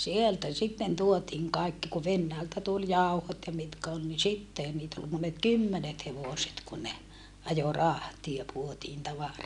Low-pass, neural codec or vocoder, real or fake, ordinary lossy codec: 10.8 kHz; none; real; none